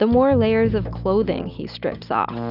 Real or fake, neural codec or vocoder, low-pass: real; none; 5.4 kHz